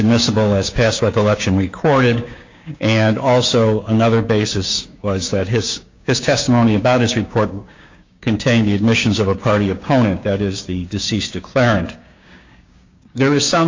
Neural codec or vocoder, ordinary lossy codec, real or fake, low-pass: codec, 16 kHz, 6 kbps, DAC; MP3, 64 kbps; fake; 7.2 kHz